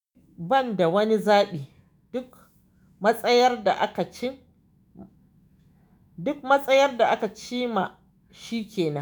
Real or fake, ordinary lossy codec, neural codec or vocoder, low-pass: fake; none; autoencoder, 48 kHz, 128 numbers a frame, DAC-VAE, trained on Japanese speech; none